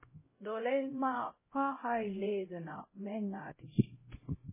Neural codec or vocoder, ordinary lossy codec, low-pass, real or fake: codec, 16 kHz, 0.5 kbps, X-Codec, HuBERT features, trained on LibriSpeech; MP3, 16 kbps; 3.6 kHz; fake